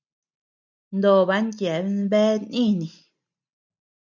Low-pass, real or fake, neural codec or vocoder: 7.2 kHz; real; none